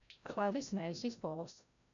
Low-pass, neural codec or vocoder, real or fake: 7.2 kHz; codec, 16 kHz, 0.5 kbps, FreqCodec, larger model; fake